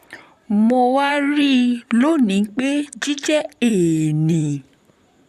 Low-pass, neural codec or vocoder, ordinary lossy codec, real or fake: 14.4 kHz; vocoder, 44.1 kHz, 128 mel bands, Pupu-Vocoder; none; fake